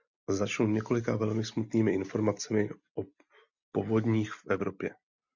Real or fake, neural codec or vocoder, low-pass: real; none; 7.2 kHz